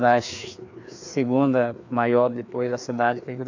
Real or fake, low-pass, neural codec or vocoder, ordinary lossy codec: fake; 7.2 kHz; codec, 16 kHz, 2 kbps, FreqCodec, larger model; AAC, 48 kbps